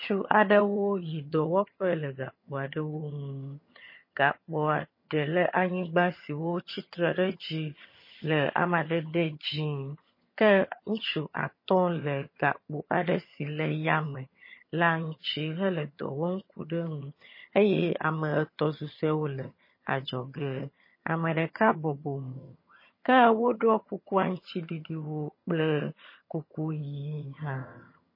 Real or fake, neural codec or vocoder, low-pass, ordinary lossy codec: fake; vocoder, 22.05 kHz, 80 mel bands, HiFi-GAN; 5.4 kHz; MP3, 24 kbps